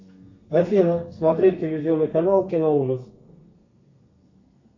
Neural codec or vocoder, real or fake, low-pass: codec, 32 kHz, 1.9 kbps, SNAC; fake; 7.2 kHz